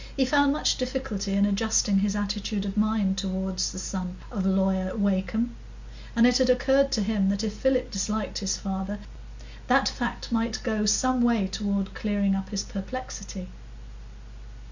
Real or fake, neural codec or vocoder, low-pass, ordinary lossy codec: real; none; 7.2 kHz; Opus, 64 kbps